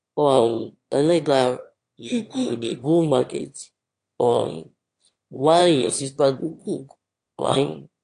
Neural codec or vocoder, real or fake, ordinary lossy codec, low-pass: autoencoder, 22.05 kHz, a latent of 192 numbers a frame, VITS, trained on one speaker; fake; AAC, 48 kbps; 9.9 kHz